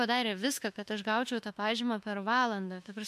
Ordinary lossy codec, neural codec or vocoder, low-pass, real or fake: MP3, 64 kbps; autoencoder, 48 kHz, 32 numbers a frame, DAC-VAE, trained on Japanese speech; 14.4 kHz; fake